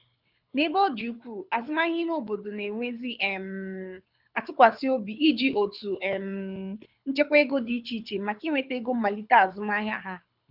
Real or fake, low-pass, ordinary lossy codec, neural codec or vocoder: fake; 5.4 kHz; none; codec, 24 kHz, 6 kbps, HILCodec